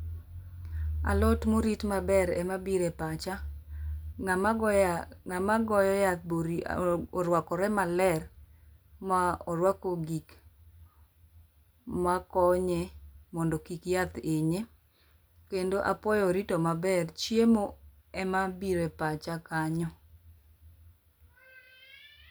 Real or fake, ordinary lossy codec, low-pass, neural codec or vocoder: real; none; none; none